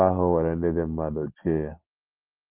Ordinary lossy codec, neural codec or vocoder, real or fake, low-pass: Opus, 16 kbps; none; real; 3.6 kHz